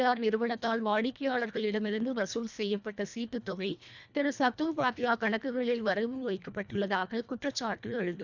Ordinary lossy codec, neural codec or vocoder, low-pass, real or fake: none; codec, 24 kHz, 1.5 kbps, HILCodec; 7.2 kHz; fake